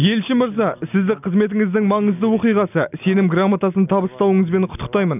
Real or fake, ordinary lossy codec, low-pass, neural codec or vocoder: real; none; 3.6 kHz; none